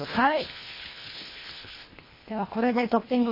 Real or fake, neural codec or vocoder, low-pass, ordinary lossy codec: fake; codec, 24 kHz, 1.5 kbps, HILCodec; 5.4 kHz; MP3, 24 kbps